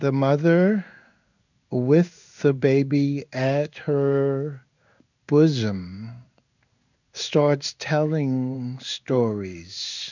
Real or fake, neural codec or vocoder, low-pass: fake; codec, 16 kHz in and 24 kHz out, 1 kbps, XY-Tokenizer; 7.2 kHz